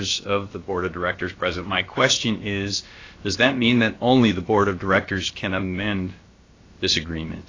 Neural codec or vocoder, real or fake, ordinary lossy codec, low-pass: codec, 16 kHz, about 1 kbps, DyCAST, with the encoder's durations; fake; AAC, 32 kbps; 7.2 kHz